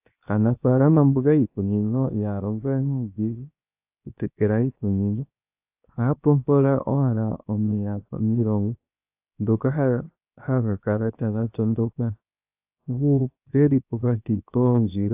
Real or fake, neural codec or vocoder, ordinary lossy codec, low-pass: fake; codec, 16 kHz, 0.7 kbps, FocalCodec; AAC, 32 kbps; 3.6 kHz